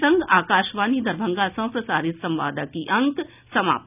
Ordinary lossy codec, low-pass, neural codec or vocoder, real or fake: none; 3.6 kHz; none; real